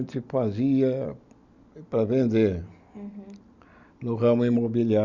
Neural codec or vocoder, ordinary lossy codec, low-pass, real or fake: none; none; 7.2 kHz; real